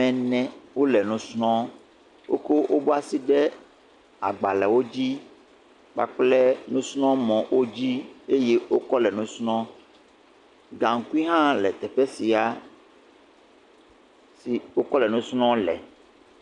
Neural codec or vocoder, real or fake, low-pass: none; real; 10.8 kHz